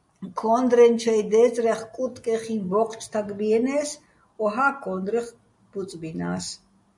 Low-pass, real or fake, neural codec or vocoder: 10.8 kHz; real; none